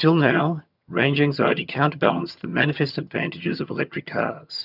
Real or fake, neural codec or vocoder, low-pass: fake; vocoder, 22.05 kHz, 80 mel bands, HiFi-GAN; 5.4 kHz